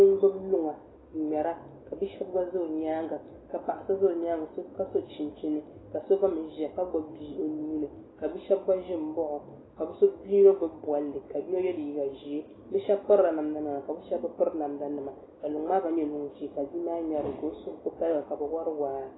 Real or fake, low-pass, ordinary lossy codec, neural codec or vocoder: real; 7.2 kHz; AAC, 16 kbps; none